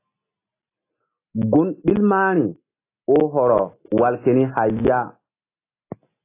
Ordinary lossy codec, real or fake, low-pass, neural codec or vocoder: AAC, 24 kbps; real; 3.6 kHz; none